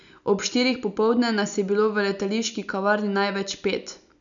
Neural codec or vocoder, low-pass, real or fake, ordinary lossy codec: none; 7.2 kHz; real; none